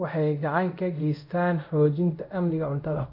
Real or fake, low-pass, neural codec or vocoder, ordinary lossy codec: fake; 5.4 kHz; codec, 24 kHz, 0.5 kbps, DualCodec; none